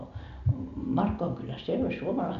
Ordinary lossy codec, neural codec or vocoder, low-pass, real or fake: none; none; 7.2 kHz; real